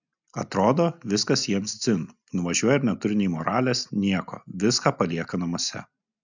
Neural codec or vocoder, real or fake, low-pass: none; real; 7.2 kHz